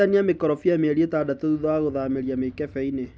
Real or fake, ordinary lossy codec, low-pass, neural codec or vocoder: real; none; none; none